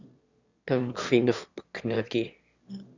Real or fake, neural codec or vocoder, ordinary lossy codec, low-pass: fake; autoencoder, 22.05 kHz, a latent of 192 numbers a frame, VITS, trained on one speaker; Opus, 64 kbps; 7.2 kHz